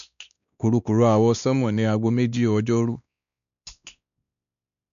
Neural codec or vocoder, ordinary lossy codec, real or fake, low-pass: codec, 16 kHz, 2 kbps, X-Codec, WavLM features, trained on Multilingual LibriSpeech; none; fake; 7.2 kHz